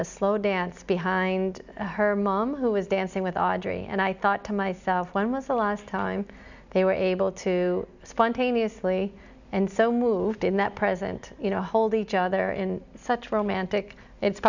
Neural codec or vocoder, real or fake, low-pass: none; real; 7.2 kHz